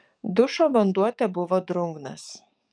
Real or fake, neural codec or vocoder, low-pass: fake; codec, 44.1 kHz, 7.8 kbps, DAC; 9.9 kHz